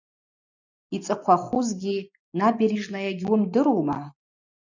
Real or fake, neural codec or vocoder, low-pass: real; none; 7.2 kHz